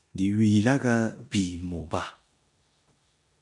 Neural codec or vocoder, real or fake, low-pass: codec, 16 kHz in and 24 kHz out, 0.9 kbps, LongCat-Audio-Codec, four codebook decoder; fake; 10.8 kHz